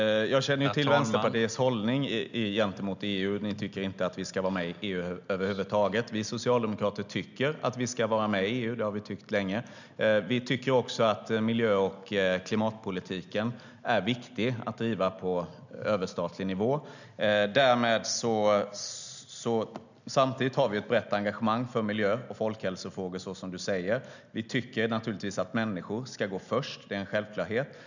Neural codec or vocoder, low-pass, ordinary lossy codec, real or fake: none; 7.2 kHz; none; real